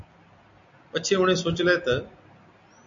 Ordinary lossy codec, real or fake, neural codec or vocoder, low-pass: MP3, 64 kbps; real; none; 7.2 kHz